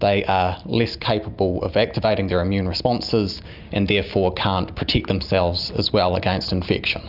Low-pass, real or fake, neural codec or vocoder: 5.4 kHz; real; none